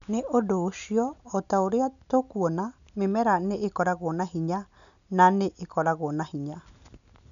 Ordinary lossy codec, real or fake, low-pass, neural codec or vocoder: none; real; 7.2 kHz; none